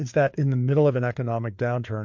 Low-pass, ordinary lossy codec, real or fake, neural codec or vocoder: 7.2 kHz; MP3, 48 kbps; fake; codec, 16 kHz, 4 kbps, FreqCodec, larger model